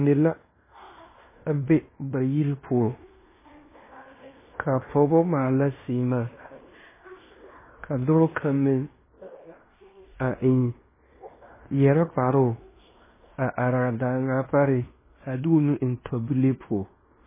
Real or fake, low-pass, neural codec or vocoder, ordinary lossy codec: fake; 3.6 kHz; codec, 16 kHz in and 24 kHz out, 0.9 kbps, LongCat-Audio-Codec, fine tuned four codebook decoder; MP3, 16 kbps